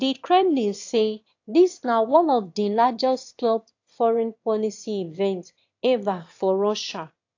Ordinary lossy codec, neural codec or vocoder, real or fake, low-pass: AAC, 48 kbps; autoencoder, 22.05 kHz, a latent of 192 numbers a frame, VITS, trained on one speaker; fake; 7.2 kHz